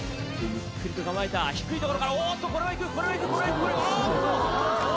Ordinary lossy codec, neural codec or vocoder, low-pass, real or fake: none; none; none; real